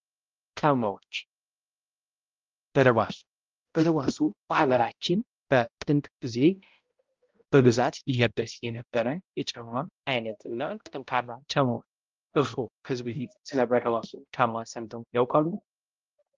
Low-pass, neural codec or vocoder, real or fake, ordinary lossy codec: 7.2 kHz; codec, 16 kHz, 0.5 kbps, X-Codec, HuBERT features, trained on balanced general audio; fake; Opus, 32 kbps